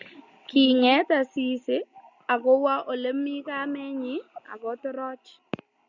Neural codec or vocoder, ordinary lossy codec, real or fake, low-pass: none; Opus, 64 kbps; real; 7.2 kHz